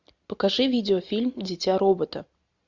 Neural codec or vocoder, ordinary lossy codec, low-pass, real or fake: none; Opus, 64 kbps; 7.2 kHz; real